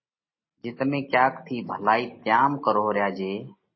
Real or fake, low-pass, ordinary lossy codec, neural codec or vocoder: real; 7.2 kHz; MP3, 24 kbps; none